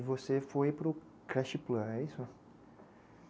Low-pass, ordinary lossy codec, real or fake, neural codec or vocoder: none; none; real; none